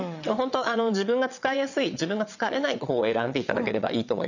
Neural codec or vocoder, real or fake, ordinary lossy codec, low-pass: vocoder, 22.05 kHz, 80 mel bands, WaveNeXt; fake; none; 7.2 kHz